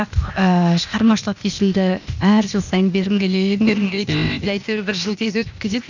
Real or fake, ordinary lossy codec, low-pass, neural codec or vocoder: fake; none; 7.2 kHz; codec, 16 kHz, 0.8 kbps, ZipCodec